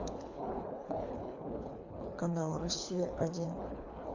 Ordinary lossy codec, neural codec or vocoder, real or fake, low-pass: AAC, 48 kbps; codec, 24 kHz, 3 kbps, HILCodec; fake; 7.2 kHz